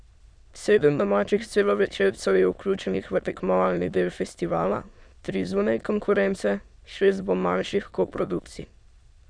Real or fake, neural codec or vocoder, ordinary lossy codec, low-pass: fake; autoencoder, 22.05 kHz, a latent of 192 numbers a frame, VITS, trained on many speakers; Opus, 64 kbps; 9.9 kHz